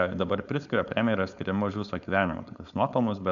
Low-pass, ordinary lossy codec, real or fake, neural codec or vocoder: 7.2 kHz; MP3, 96 kbps; fake; codec, 16 kHz, 4.8 kbps, FACodec